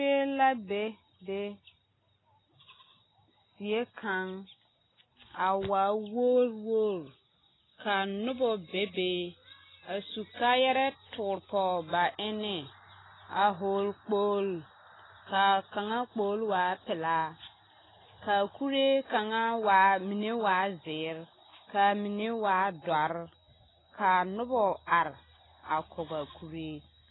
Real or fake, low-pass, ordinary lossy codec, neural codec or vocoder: real; 7.2 kHz; AAC, 16 kbps; none